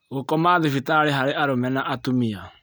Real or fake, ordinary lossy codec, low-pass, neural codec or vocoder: real; none; none; none